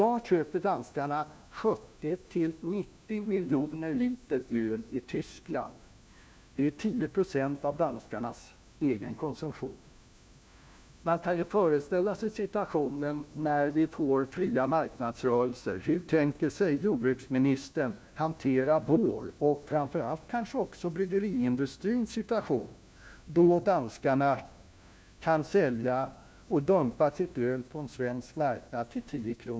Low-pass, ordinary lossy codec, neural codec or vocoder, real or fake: none; none; codec, 16 kHz, 1 kbps, FunCodec, trained on LibriTTS, 50 frames a second; fake